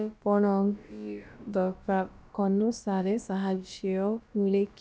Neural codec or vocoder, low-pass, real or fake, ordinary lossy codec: codec, 16 kHz, about 1 kbps, DyCAST, with the encoder's durations; none; fake; none